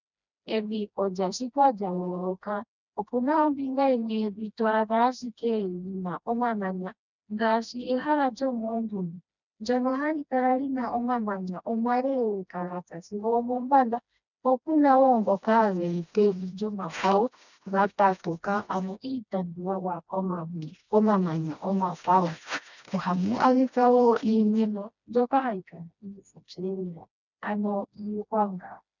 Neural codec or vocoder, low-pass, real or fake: codec, 16 kHz, 1 kbps, FreqCodec, smaller model; 7.2 kHz; fake